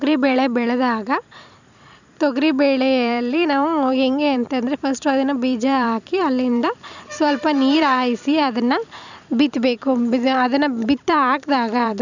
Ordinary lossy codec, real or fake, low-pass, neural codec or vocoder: none; fake; 7.2 kHz; vocoder, 44.1 kHz, 128 mel bands every 256 samples, BigVGAN v2